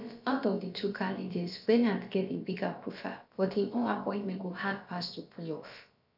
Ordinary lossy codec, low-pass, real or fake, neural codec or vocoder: none; 5.4 kHz; fake; codec, 16 kHz, about 1 kbps, DyCAST, with the encoder's durations